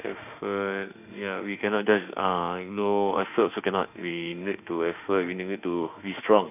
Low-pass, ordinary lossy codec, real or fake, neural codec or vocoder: 3.6 kHz; none; fake; autoencoder, 48 kHz, 32 numbers a frame, DAC-VAE, trained on Japanese speech